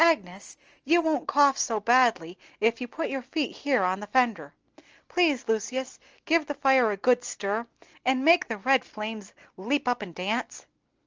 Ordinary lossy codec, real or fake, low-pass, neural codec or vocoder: Opus, 24 kbps; real; 7.2 kHz; none